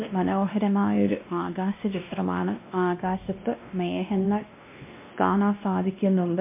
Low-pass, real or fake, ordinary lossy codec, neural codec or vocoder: 3.6 kHz; fake; MP3, 24 kbps; codec, 16 kHz, 1 kbps, X-Codec, WavLM features, trained on Multilingual LibriSpeech